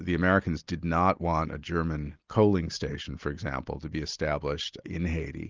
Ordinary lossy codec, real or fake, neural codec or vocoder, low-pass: Opus, 32 kbps; real; none; 7.2 kHz